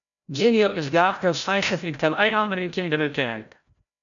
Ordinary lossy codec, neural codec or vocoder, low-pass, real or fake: MP3, 96 kbps; codec, 16 kHz, 0.5 kbps, FreqCodec, larger model; 7.2 kHz; fake